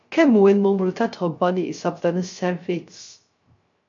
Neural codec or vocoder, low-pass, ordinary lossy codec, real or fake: codec, 16 kHz, 0.3 kbps, FocalCodec; 7.2 kHz; MP3, 48 kbps; fake